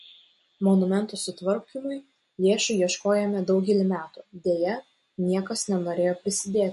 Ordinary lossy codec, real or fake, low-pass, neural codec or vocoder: MP3, 48 kbps; real; 14.4 kHz; none